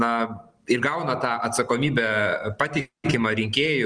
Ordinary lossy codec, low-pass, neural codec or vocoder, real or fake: Opus, 24 kbps; 9.9 kHz; none; real